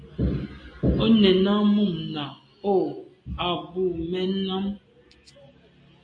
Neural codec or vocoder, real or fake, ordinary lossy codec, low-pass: none; real; AAC, 48 kbps; 9.9 kHz